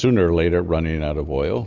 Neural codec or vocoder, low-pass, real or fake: none; 7.2 kHz; real